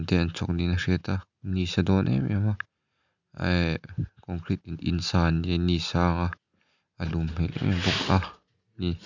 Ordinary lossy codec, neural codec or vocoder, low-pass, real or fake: none; none; 7.2 kHz; real